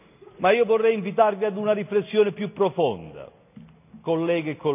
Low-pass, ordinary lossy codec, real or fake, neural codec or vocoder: 3.6 kHz; none; real; none